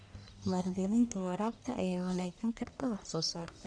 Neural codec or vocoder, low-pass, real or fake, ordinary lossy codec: codec, 44.1 kHz, 1.7 kbps, Pupu-Codec; 9.9 kHz; fake; none